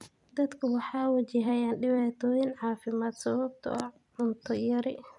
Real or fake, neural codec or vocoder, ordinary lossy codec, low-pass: fake; vocoder, 44.1 kHz, 128 mel bands every 256 samples, BigVGAN v2; none; 10.8 kHz